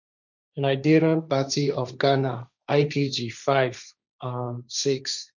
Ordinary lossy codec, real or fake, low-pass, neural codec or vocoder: none; fake; 7.2 kHz; codec, 16 kHz, 1.1 kbps, Voila-Tokenizer